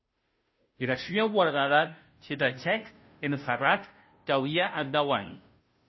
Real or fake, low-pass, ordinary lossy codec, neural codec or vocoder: fake; 7.2 kHz; MP3, 24 kbps; codec, 16 kHz, 0.5 kbps, FunCodec, trained on Chinese and English, 25 frames a second